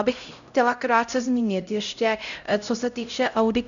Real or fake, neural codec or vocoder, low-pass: fake; codec, 16 kHz, 0.5 kbps, X-Codec, HuBERT features, trained on LibriSpeech; 7.2 kHz